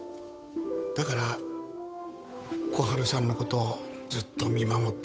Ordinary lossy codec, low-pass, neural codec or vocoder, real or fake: none; none; codec, 16 kHz, 8 kbps, FunCodec, trained on Chinese and English, 25 frames a second; fake